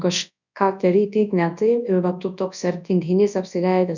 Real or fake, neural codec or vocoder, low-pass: fake; codec, 24 kHz, 0.9 kbps, WavTokenizer, large speech release; 7.2 kHz